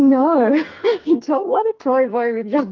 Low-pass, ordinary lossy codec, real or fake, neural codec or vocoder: 7.2 kHz; Opus, 32 kbps; fake; codec, 44.1 kHz, 2.6 kbps, SNAC